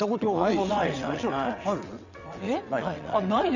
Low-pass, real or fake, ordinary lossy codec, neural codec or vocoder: 7.2 kHz; fake; none; vocoder, 22.05 kHz, 80 mel bands, WaveNeXt